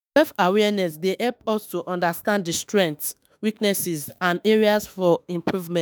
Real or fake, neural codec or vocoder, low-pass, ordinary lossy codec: fake; autoencoder, 48 kHz, 32 numbers a frame, DAC-VAE, trained on Japanese speech; none; none